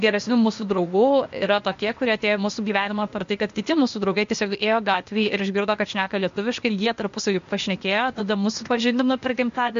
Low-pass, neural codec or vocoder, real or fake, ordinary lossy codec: 7.2 kHz; codec, 16 kHz, 0.8 kbps, ZipCodec; fake; MP3, 48 kbps